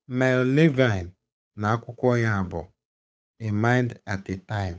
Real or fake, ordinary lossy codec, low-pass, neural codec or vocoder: fake; none; none; codec, 16 kHz, 8 kbps, FunCodec, trained on Chinese and English, 25 frames a second